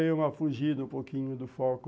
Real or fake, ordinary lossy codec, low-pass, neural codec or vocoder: real; none; none; none